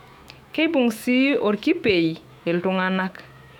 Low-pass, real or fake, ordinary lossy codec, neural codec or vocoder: 19.8 kHz; fake; none; autoencoder, 48 kHz, 128 numbers a frame, DAC-VAE, trained on Japanese speech